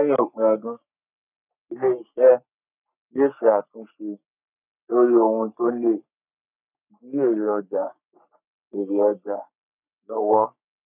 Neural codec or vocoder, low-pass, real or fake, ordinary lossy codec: codec, 44.1 kHz, 3.4 kbps, Pupu-Codec; 3.6 kHz; fake; none